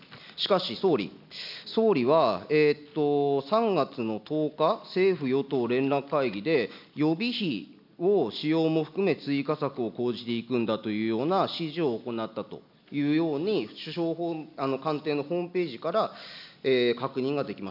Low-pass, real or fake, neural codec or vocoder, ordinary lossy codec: 5.4 kHz; real; none; none